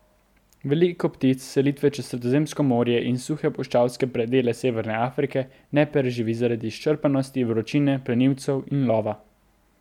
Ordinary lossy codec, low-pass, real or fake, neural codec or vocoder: MP3, 96 kbps; 19.8 kHz; real; none